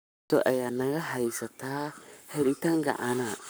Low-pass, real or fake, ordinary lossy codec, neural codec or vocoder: none; fake; none; codec, 44.1 kHz, 7.8 kbps, Pupu-Codec